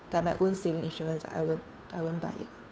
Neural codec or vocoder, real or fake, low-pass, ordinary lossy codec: codec, 16 kHz, 2 kbps, FunCodec, trained on Chinese and English, 25 frames a second; fake; none; none